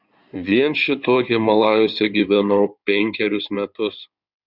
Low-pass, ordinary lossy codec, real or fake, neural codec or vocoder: 5.4 kHz; Opus, 64 kbps; fake; codec, 16 kHz in and 24 kHz out, 2.2 kbps, FireRedTTS-2 codec